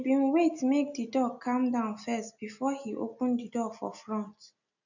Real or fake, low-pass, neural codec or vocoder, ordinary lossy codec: real; 7.2 kHz; none; none